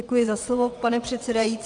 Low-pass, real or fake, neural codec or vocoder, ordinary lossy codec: 9.9 kHz; fake; vocoder, 22.05 kHz, 80 mel bands, WaveNeXt; AAC, 64 kbps